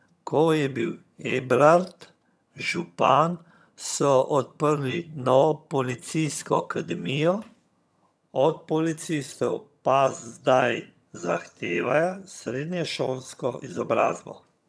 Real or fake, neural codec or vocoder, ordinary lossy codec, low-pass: fake; vocoder, 22.05 kHz, 80 mel bands, HiFi-GAN; none; none